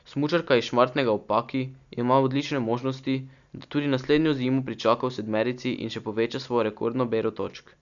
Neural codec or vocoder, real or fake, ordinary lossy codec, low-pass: none; real; none; 7.2 kHz